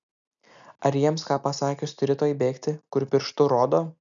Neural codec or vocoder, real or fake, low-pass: none; real; 7.2 kHz